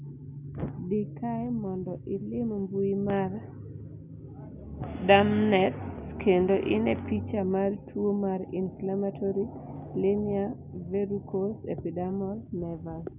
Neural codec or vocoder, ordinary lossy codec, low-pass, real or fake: none; none; 3.6 kHz; real